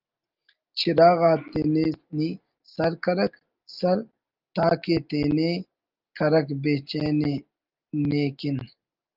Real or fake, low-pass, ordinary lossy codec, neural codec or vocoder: real; 5.4 kHz; Opus, 32 kbps; none